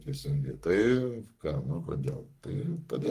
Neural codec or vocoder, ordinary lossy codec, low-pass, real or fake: codec, 44.1 kHz, 3.4 kbps, Pupu-Codec; Opus, 32 kbps; 14.4 kHz; fake